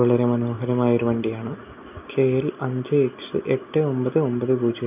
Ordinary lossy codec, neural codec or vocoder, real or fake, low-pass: none; none; real; 3.6 kHz